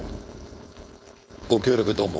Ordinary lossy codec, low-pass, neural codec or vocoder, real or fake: none; none; codec, 16 kHz, 4.8 kbps, FACodec; fake